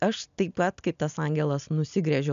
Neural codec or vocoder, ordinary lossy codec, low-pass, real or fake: none; MP3, 96 kbps; 7.2 kHz; real